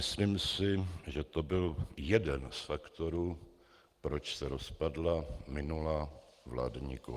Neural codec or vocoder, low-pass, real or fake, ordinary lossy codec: none; 14.4 kHz; real; Opus, 24 kbps